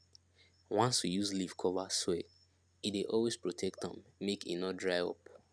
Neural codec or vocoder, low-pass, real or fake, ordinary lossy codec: none; none; real; none